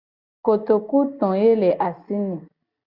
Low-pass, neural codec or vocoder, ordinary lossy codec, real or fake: 5.4 kHz; none; Opus, 64 kbps; real